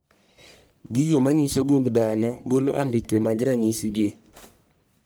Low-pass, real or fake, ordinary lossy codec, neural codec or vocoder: none; fake; none; codec, 44.1 kHz, 1.7 kbps, Pupu-Codec